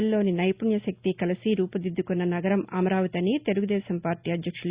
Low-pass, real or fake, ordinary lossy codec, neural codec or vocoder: 3.6 kHz; real; Opus, 64 kbps; none